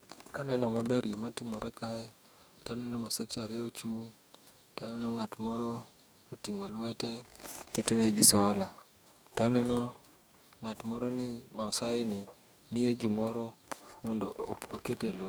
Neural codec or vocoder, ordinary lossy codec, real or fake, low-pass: codec, 44.1 kHz, 2.6 kbps, DAC; none; fake; none